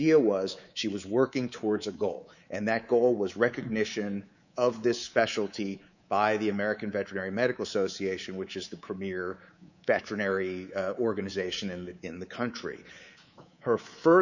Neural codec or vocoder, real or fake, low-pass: codec, 24 kHz, 3.1 kbps, DualCodec; fake; 7.2 kHz